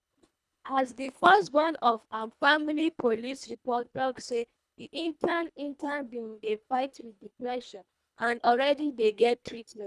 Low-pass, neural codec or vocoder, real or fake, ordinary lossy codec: none; codec, 24 kHz, 1.5 kbps, HILCodec; fake; none